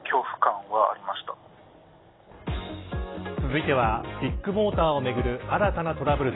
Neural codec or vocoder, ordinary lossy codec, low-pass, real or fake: none; AAC, 16 kbps; 7.2 kHz; real